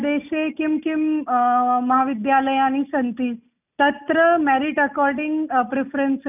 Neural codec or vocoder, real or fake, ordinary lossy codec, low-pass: none; real; none; 3.6 kHz